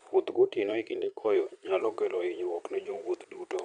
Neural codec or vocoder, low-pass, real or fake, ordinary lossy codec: vocoder, 22.05 kHz, 80 mel bands, Vocos; 9.9 kHz; fake; none